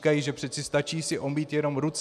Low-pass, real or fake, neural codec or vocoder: 14.4 kHz; real; none